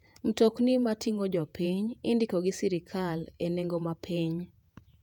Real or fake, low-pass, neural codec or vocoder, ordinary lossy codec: fake; 19.8 kHz; vocoder, 48 kHz, 128 mel bands, Vocos; none